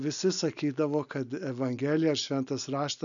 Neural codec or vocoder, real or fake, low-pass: none; real; 7.2 kHz